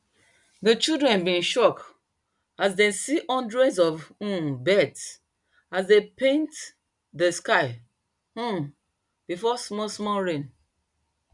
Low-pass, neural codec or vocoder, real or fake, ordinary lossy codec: 10.8 kHz; none; real; none